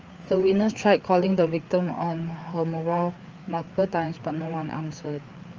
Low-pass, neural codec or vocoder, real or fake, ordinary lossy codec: 7.2 kHz; codec, 16 kHz, 4 kbps, FreqCodec, larger model; fake; Opus, 24 kbps